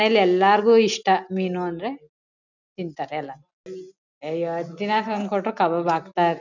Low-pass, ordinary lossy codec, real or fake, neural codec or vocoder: 7.2 kHz; none; real; none